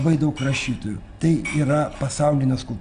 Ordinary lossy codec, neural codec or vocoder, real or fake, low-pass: Opus, 64 kbps; none; real; 9.9 kHz